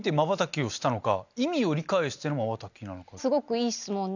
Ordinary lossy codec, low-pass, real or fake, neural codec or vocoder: none; 7.2 kHz; real; none